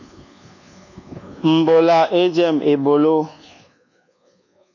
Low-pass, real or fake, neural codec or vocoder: 7.2 kHz; fake; codec, 24 kHz, 1.2 kbps, DualCodec